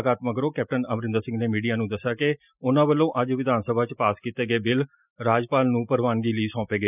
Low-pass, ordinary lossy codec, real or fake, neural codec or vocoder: 3.6 kHz; none; real; none